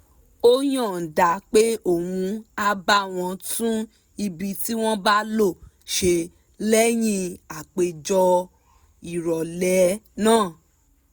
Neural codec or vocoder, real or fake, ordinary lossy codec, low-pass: none; real; none; none